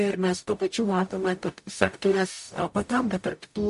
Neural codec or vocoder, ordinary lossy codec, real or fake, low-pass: codec, 44.1 kHz, 0.9 kbps, DAC; MP3, 48 kbps; fake; 14.4 kHz